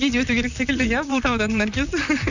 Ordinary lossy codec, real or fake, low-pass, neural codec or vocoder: none; fake; 7.2 kHz; vocoder, 44.1 kHz, 128 mel bands, Pupu-Vocoder